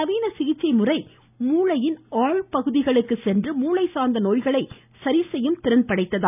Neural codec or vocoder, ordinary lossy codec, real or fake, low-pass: none; none; real; 3.6 kHz